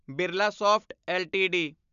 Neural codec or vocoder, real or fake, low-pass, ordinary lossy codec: none; real; 7.2 kHz; none